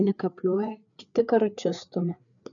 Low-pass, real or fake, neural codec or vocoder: 7.2 kHz; fake; codec, 16 kHz, 4 kbps, FreqCodec, larger model